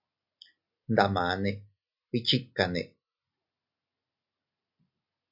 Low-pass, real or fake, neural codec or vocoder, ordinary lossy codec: 5.4 kHz; real; none; MP3, 48 kbps